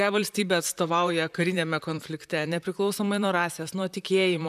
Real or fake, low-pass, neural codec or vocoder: fake; 14.4 kHz; vocoder, 44.1 kHz, 128 mel bands, Pupu-Vocoder